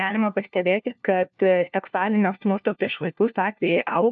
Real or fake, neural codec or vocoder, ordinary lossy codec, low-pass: fake; codec, 16 kHz, 1 kbps, FunCodec, trained on LibriTTS, 50 frames a second; AAC, 64 kbps; 7.2 kHz